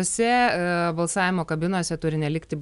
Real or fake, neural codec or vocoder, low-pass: real; none; 10.8 kHz